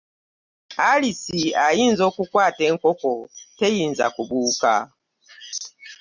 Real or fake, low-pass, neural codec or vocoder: real; 7.2 kHz; none